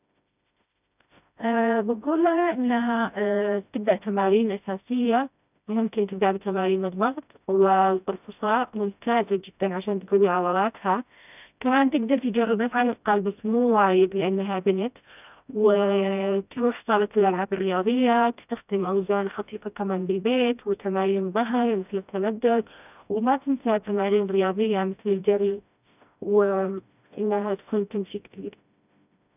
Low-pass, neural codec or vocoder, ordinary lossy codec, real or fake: 3.6 kHz; codec, 16 kHz, 1 kbps, FreqCodec, smaller model; none; fake